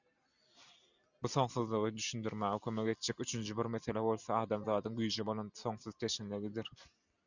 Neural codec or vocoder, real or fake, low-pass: none; real; 7.2 kHz